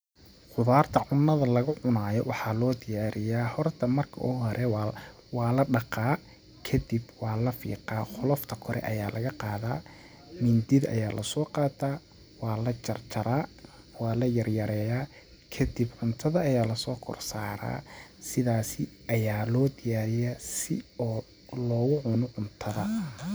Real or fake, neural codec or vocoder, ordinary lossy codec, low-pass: real; none; none; none